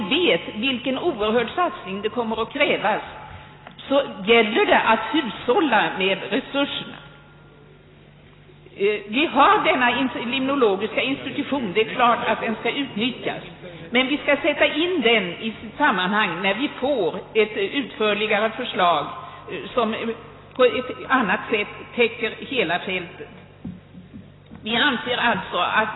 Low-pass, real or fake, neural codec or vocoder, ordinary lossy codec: 7.2 kHz; real; none; AAC, 16 kbps